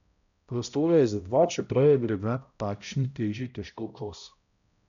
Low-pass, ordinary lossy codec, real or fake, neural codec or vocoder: 7.2 kHz; none; fake; codec, 16 kHz, 0.5 kbps, X-Codec, HuBERT features, trained on balanced general audio